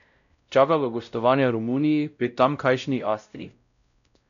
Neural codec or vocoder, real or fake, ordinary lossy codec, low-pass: codec, 16 kHz, 0.5 kbps, X-Codec, WavLM features, trained on Multilingual LibriSpeech; fake; none; 7.2 kHz